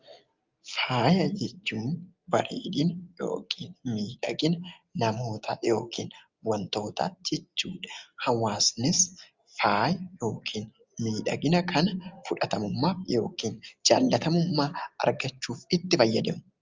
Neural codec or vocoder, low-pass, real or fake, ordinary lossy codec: none; 7.2 kHz; real; Opus, 32 kbps